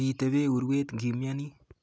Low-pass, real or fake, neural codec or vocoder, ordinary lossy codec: none; real; none; none